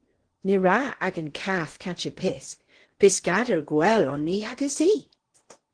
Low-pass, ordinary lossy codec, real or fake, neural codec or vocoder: 9.9 kHz; Opus, 16 kbps; fake; codec, 16 kHz in and 24 kHz out, 0.8 kbps, FocalCodec, streaming, 65536 codes